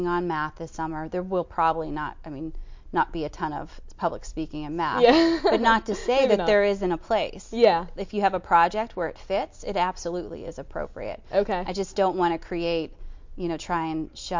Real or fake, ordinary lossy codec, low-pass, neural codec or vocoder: real; MP3, 48 kbps; 7.2 kHz; none